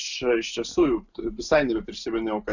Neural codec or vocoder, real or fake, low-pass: none; real; 7.2 kHz